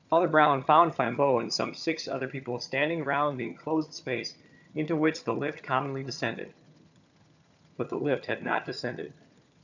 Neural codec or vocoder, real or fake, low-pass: vocoder, 22.05 kHz, 80 mel bands, HiFi-GAN; fake; 7.2 kHz